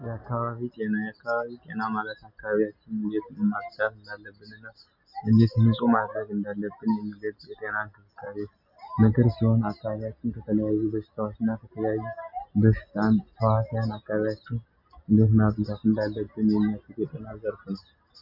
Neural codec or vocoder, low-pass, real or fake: none; 5.4 kHz; real